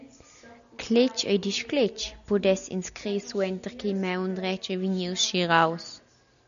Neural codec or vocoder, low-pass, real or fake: none; 7.2 kHz; real